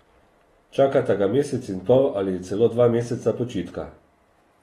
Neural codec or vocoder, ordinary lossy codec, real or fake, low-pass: none; AAC, 32 kbps; real; 19.8 kHz